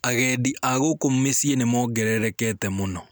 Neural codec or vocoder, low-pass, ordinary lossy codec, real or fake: none; none; none; real